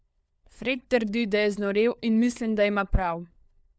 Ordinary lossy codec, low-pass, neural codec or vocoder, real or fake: none; none; codec, 16 kHz, 4 kbps, FunCodec, trained on LibriTTS, 50 frames a second; fake